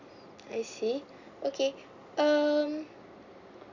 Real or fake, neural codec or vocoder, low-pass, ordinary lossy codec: real; none; 7.2 kHz; none